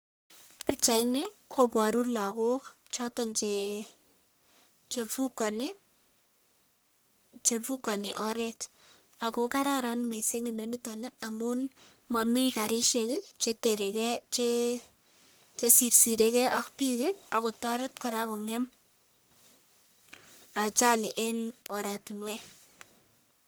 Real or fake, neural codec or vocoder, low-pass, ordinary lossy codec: fake; codec, 44.1 kHz, 1.7 kbps, Pupu-Codec; none; none